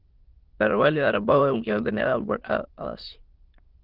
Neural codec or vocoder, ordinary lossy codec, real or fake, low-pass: autoencoder, 22.05 kHz, a latent of 192 numbers a frame, VITS, trained on many speakers; Opus, 16 kbps; fake; 5.4 kHz